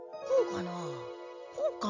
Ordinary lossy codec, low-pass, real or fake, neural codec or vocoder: none; 7.2 kHz; real; none